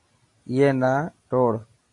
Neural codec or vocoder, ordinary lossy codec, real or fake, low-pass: none; AAC, 48 kbps; real; 10.8 kHz